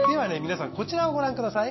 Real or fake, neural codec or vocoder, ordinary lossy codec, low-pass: real; none; MP3, 24 kbps; 7.2 kHz